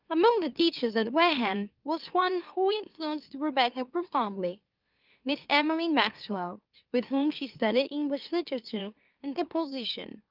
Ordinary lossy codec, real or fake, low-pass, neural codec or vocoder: Opus, 32 kbps; fake; 5.4 kHz; autoencoder, 44.1 kHz, a latent of 192 numbers a frame, MeloTTS